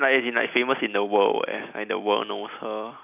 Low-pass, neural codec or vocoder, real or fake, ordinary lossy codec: 3.6 kHz; none; real; none